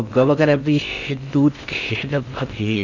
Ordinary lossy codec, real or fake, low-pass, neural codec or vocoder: none; fake; 7.2 kHz; codec, 16 kHz in and 24 kHz out, 0.6 kbps, FocalCodec, streaming, 4096 codes